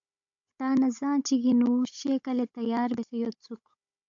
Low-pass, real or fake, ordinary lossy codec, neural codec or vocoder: 7.2 kHz; fake; AAC, 64 kbps; codec, 16 kHz, 16 kbps, FunCodec, trained on Chinese and English, 50 frames a second